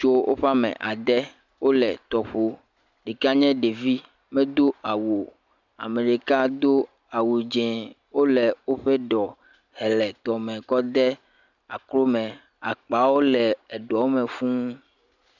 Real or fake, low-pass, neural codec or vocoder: real; 7.2 kHz; none